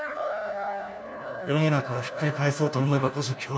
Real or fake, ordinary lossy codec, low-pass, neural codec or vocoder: fake; none; none; codec, 16 kHz, 1 kbps, FunCodec, trained on LibriTTS, 50 frames a second